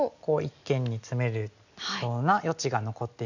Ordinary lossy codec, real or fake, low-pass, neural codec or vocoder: none; real; 7.2 kHz; none